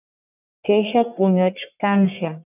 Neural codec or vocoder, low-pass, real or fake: codec, 44.1 kHz, 1.7 kbps, Pupu-Codec; 3.6 kHz; fake